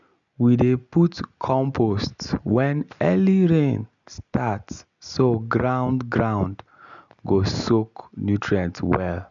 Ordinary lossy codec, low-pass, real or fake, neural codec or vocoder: none; 7.2 kHz; real; none